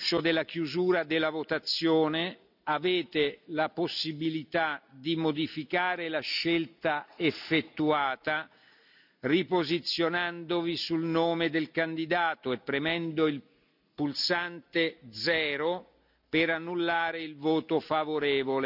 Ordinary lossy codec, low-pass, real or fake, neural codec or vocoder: none; 5.4 kHz; real; none